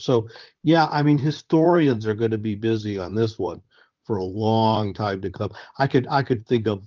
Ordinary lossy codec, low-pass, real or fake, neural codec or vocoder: Opus, 24 kbps; 7.2 kHz; fake; vocoder, 44.1 kHz, 128 mel bands, Pupu-Vocoder